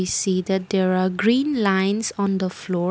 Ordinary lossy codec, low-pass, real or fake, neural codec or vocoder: none; none; real; none